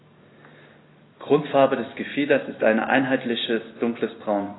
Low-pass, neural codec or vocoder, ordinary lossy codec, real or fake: 7.2 kHz; none; AAC, 16 kbps; real